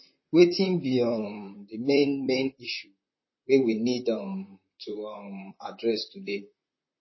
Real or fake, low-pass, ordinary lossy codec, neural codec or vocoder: fake; 7.2 kHz; MP3, 24 kbps; vocoder, 44.1 kHz, 128 mel bands, Pupu-Vocoder